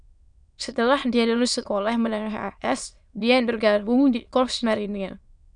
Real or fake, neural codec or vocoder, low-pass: fake; autoencoder, 22.05 kHz, a latent of 192 numbers a frame, VITS, trained on many speakers; 9.9 kHz